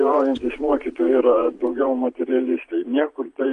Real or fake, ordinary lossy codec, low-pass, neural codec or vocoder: fake; AAC, 96 kbps; 9.9 kHz; vocoder, 22.05 kHz, 80 mel bands, Vocos